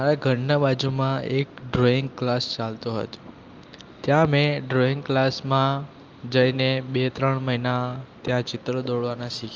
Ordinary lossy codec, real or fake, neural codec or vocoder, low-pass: Opus, 24 kbps; real; none; 7.2 kHz